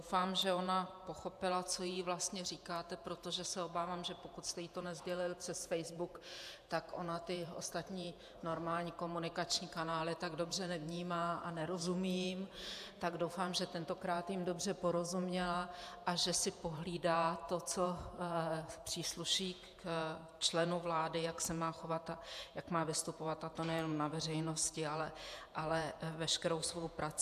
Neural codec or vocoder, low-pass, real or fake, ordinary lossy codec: vocoder, 48 kHz, 128 mel bands, Vocos; 14.4 kHz; fake; AAC, 96 kbps